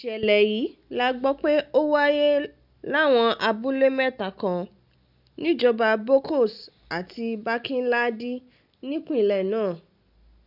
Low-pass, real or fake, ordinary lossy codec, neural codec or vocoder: 5.4 kHz; real; none; none